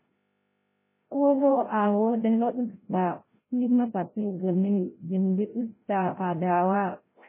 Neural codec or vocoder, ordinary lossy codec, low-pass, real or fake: codec, 16 kHz, 0.5 kbps, FreqCodec, larger model; MP3, 16 kbps; 3.6 kHz; fake